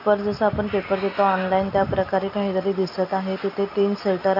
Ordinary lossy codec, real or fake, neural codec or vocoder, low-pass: none; real; none; 5.4 kHz